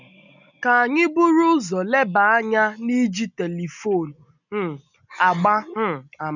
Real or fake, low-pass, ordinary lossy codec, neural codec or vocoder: real; 7.2 kHz; none; none